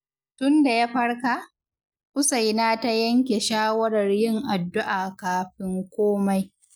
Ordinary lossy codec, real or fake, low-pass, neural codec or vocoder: none; real; none; none